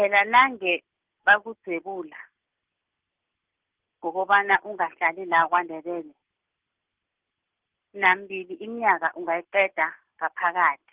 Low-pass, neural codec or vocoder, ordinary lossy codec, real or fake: 3.6 kHz; none; Opus, 16 kbps; real